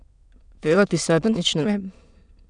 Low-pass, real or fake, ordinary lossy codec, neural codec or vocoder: 9.9 kHz; fake; none; autoencoder, 22.05 kHz, a latent of 192 numbers a frame, VITS, trained on many speakers